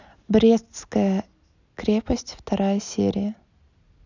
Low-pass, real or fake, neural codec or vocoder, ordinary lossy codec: 7.2 kHz; real; none; none